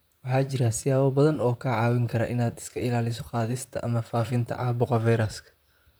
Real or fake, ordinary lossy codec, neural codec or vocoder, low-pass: fake; none; vocoder, 44.1 kHz, 128 mel bands, Pupu-Vocoder; none